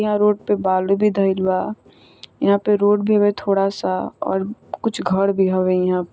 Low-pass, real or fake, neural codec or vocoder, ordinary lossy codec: none; real; none; none